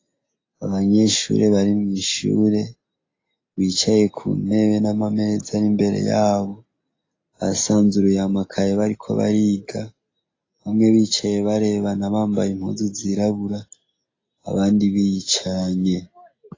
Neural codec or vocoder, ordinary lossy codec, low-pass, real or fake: none; AAC, 32 kbps; 7.2 kHz; real